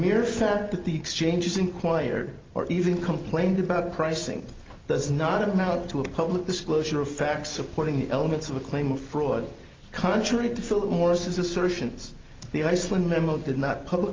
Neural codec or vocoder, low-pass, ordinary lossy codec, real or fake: none; 7.2 kHz; Opus, 24 kbps; real